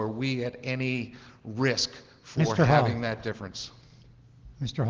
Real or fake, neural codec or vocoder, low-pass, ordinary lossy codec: real; none; 7.2 kHz; Opus, 16 kbps